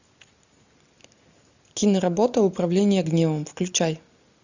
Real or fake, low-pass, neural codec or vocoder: real; 7.2 kHz; none